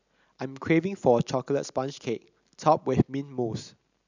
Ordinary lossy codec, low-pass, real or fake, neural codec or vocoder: none; 7.2 kHz; real; none